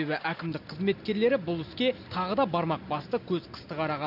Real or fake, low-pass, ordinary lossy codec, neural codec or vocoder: real; 5.4 kHz; none; none